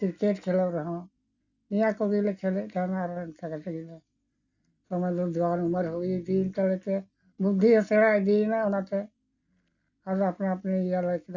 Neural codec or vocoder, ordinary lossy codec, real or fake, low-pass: none; none; real; 7.2 kHz